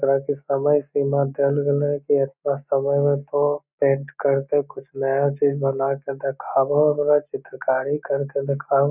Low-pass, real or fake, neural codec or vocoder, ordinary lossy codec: 3.6 kHz; real; none; none